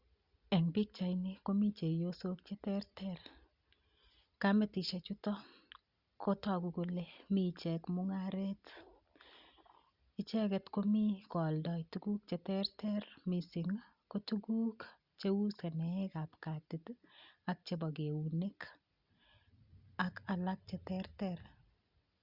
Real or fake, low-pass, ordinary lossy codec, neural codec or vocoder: real; 5.4 kHz; Opus, 64 kbps; none